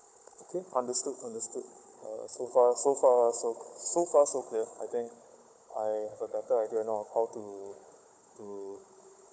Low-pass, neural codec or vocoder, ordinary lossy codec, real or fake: none; codec, 16 kHz, 16 kbps, FunCodec, trained on Chinese and English, 50 frames a second; none; fake